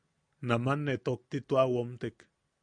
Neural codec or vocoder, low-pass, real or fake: none; 9.9 kHz; real